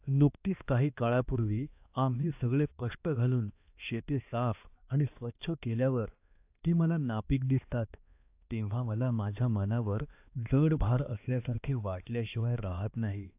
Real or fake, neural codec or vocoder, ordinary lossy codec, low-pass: fake; codec, 16 kHz, 2 kbps, X-Codec, HuBERT features, trained on balanced general audio; AAC, 32 kbps; 3.6 kHz